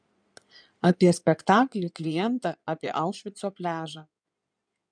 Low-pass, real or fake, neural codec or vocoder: 9.9 kHz; fake; codec, 16 kHz in and 24 kHz out, 2.2 kbps, FireRedTTS-2 codec